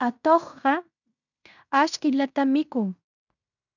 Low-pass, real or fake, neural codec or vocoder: 7.2 kHz; fake; codec, 16 kHz in and 24 kHz out, 0.9 kbps, LongCat-Audio-Codec, fine tuned four codebook decoder